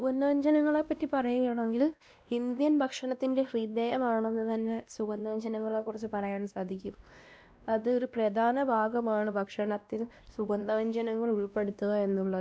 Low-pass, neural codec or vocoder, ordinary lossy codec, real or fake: none; codec, 16 kHz, 1 kbps, X-Codec, WavLM features, trained on Multilingual LibriSpeech; none; fake